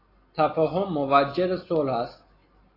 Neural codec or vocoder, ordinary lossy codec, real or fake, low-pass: none; AAC, 24 kbps; real; 5.4 kHz